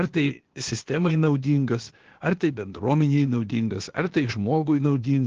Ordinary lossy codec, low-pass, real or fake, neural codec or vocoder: Opus, 16 kbps; 7.2 kHz; fake; codec, 16 kHz, 0.8 kbps, ZipCodec